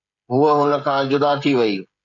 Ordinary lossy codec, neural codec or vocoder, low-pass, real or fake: MP3, 64 kbps; codec, 16 kHz, 16 kbps, FreqCodec, smaller model; 7.2 kHz; fake